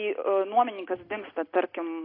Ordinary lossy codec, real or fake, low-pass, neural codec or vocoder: AAC, 24 kbps; real; 5.4 kHz; none